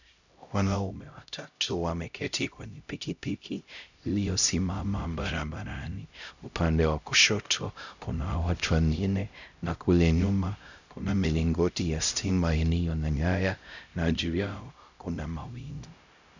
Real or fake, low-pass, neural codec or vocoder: fake; 7.2 kHz; codec, 16 kHz, 0.5 kbps, X-Codec, HuBERT features, trained on LibriSpeech